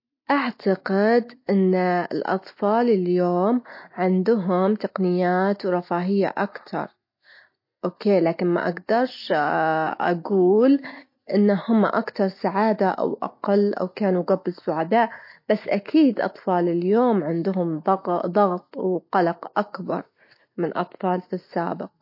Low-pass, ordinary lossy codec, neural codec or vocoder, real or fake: 5.4 kHz; MP3, 32 kbps; none; real